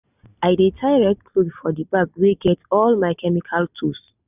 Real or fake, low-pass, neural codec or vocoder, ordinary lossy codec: real; 3.6 kHz; none; none